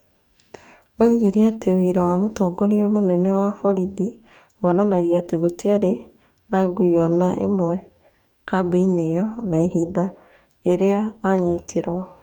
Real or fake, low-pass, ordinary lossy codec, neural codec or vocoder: fake; 19.8 kHz; none; codec, 44.1 kHz, 2.6 kbps, DAC